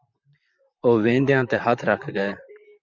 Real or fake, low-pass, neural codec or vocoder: fake; 7.2 kHz; vocoder, 44.1 kHz, 128 mel bands, Pupu-Vocoder